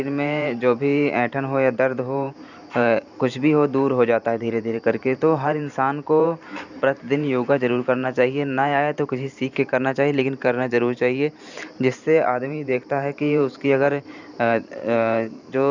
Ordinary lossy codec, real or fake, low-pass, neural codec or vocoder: none; fake; 7.2 kHz; vocoder, 44.1 kHz, 128 mel bands every 512 samples, BigVGAN v2